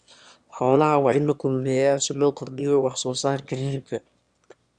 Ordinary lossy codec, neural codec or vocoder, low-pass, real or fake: Opus, 64 kbps; autoencoder, 22.05 kHz, a latent of 192 numbers a frame, VITS, trained on one speaker; 9.9 kHz; fake